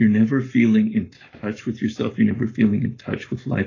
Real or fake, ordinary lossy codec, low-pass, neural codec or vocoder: fake; AAC, 32 kbps; 7.2 kHz; codec, 44.1 kHz, 7.8 kbps, Pupu-Codec